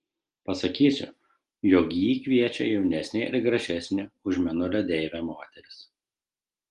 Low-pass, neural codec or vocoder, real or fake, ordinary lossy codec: 10.8 kHz; none; real; Opus, 24 kbps